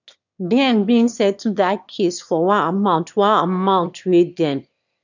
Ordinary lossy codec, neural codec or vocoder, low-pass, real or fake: none; autoencoder, 22.05 kHz, a latent of 192 numbers a frame, VITS, trained on one speaker; 7.2 kHz; fake